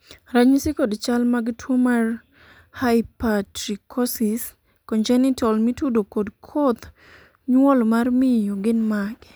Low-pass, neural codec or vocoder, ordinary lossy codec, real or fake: none; none; none; real